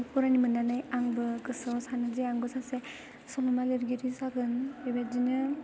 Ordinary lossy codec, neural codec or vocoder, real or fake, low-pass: none; none; real; none